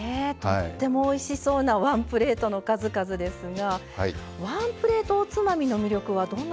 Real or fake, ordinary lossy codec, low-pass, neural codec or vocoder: real; none; none; none